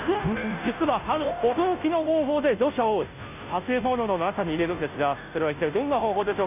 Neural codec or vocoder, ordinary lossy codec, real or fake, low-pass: codec, 16 kHz, 0.5 kbps, FunCodec, trained on Chinese and English, 25 frames a second; none; fake; 3.6 kHz